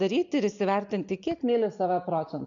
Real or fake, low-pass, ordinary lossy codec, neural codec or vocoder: real; 7.2 kHz; MP3, 96 kbps; none